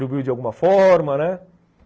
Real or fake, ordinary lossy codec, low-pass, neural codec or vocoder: real; none; none; none